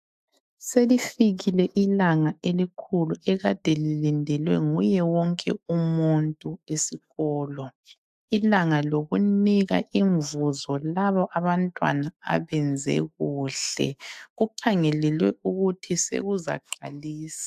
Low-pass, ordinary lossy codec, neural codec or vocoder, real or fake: 14.4 kHz; AAC, 96 kbps; autoencoder, 48 kHz, 128 numbers a frame, DAC-VAE, trained on Japanese speech; fake